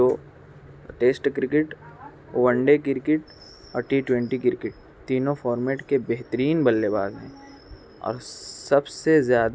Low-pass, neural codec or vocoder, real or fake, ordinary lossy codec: none; none; real; none